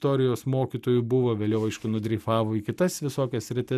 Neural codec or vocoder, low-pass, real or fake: none; 14.4 kHz; real